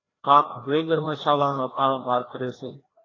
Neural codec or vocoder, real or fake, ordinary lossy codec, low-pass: codec, 16 kHz, 1 kbps, FreqCodec, larger model; fake; AAC, 32 kbps; 7.2 kHz